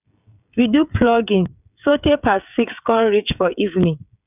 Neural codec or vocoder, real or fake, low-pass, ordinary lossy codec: codec, 16 kHz, 16 kbps, FreqCodec, smaller model; fake; 3.6 kHz; none